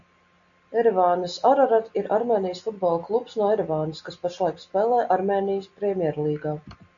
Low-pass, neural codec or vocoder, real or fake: 7.2 kHz; none; real